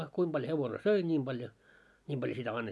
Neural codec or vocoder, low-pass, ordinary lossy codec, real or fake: none; none; none; real